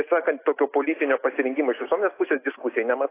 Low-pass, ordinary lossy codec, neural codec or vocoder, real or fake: 3.6 kHz; AAC, 24 kbps; none; real